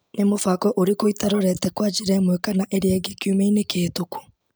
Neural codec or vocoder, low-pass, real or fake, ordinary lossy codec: none; none; real; none